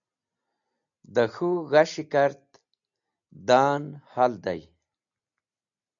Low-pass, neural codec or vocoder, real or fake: 7.2 kHz; none; real